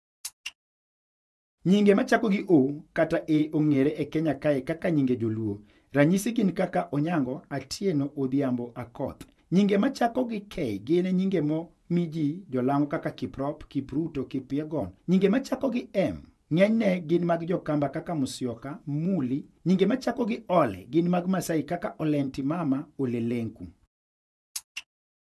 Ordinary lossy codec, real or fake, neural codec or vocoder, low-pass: none; fake; vocoder, 24 kHz, 100 mel bands, Vocos; none